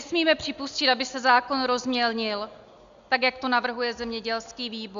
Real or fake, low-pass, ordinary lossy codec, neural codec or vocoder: real; 7.2 kHz; Opus, 64 kbps; none